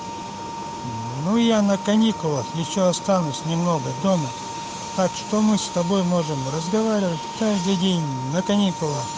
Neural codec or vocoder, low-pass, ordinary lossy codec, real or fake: codec, 16 kHz, 8 kbps, FunCodec, trained on Chinese and English, 25 frames a second; none; none; fake